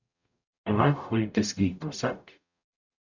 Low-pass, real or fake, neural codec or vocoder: 7.2 kHz; fake; codec, 44.1 kHz, 0.9 kbps, DAC